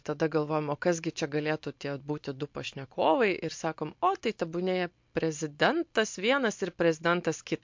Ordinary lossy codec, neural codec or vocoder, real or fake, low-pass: MP3, 48 kbps; none; real; 7.2 kHz